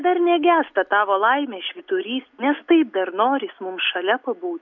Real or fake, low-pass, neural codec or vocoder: real; 7.2 kHz; none